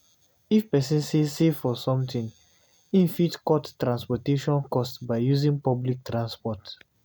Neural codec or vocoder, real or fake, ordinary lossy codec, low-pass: none; real; none; none